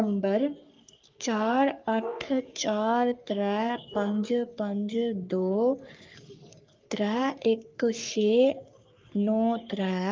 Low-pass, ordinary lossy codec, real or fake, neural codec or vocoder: 7.2 kHz; Opus, 24 kbps; fake; codec, 44.1 kHz, 3.4 kbps, Pupu-Codec